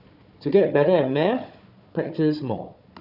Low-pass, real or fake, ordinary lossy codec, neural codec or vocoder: 5.4 kHz; fake; none; codec, 16 kHz, 4 kbps, FunCodec, trained on Chinese and English, 50 frames a second